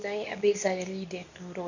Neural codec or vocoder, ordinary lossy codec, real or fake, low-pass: codec, 24 kHz, 0.9 kbps, WavTokenizer, medium speech release version 2; none; fake; 7.2 kHz